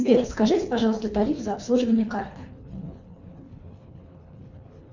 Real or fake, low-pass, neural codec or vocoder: fake; 7.2 kHz; codec, 24 kHz, 3 kbps, HILCodec